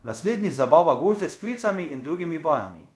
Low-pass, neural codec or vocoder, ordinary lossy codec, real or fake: none; codec, 24 kHz, 0.5 kbps, DualCodec; none; fake